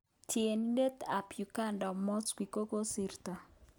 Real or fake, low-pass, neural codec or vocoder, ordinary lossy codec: real; none; none; none